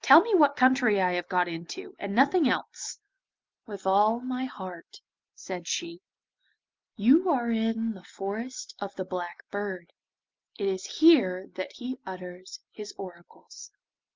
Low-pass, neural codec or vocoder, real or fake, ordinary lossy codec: 7.2 kHz; none; real; Opus, 32 kbps